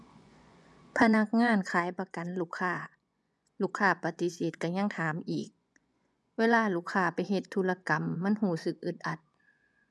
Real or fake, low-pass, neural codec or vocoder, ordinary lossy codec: fake; none; vocoder, 24 kHz, 100 mel bands, Vocos; none